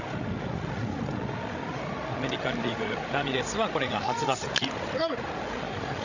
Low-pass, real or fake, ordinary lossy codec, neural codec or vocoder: 7.2 kHz; fake; none; codec, 16 kHz, 16 kbps, FreqCodec, larger model